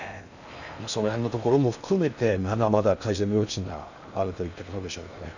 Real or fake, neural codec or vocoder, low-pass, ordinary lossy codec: fake; codec, 16 kHz in and 24 kHz out, 0.6 kbps, FocalCodec, streaming, 4096 codes; 7.2 kHz; none